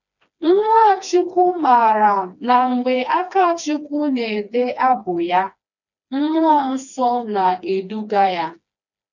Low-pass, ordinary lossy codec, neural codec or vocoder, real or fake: 7.2 kHz; none; codec, 16 kHz, 2 kbps, FreqCodec, smaller model; fake